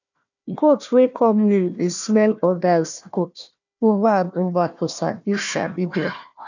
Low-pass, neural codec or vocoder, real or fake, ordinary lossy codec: 7.2 kHz; codec, 16 kHz, 1 kbps, FunCodec, trained on Chinese and English, 50 frames a second; fake; none